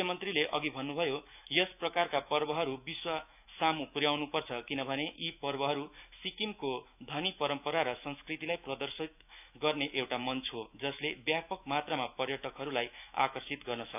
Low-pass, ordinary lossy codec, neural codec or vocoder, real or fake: 3.6 kHz; none; autoencoder, 48 kHz, 128 numbers a frame, DAC-VAE, trained on Japanese speech; fake